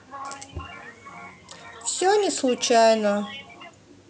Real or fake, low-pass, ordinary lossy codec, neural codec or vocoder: real; none; none; none